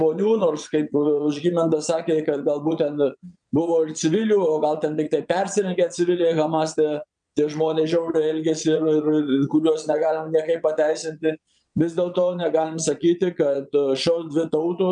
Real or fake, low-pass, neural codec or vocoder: fake; 9.9 kHz; vocoder, 22.05 kHz, 80 mel bands, Vocos